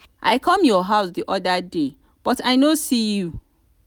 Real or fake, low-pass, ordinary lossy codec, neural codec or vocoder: real; none; none; none